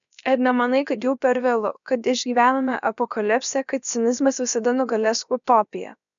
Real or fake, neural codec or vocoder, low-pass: fake; codec, 16 kHz, about 1 kbps, DyCAST, with the encoder's durations; 7.2 kHz